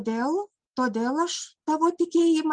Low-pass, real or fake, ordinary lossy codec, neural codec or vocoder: 9.9 kHz; real; Opus, 24 kbps; none